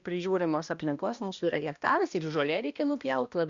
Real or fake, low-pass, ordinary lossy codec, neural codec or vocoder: fake; 7.2 kHz; Opus, 64 kbps; codec, 16 kHz, 1 kbps, X-Codec, HuBERT features, trained on balanced general audio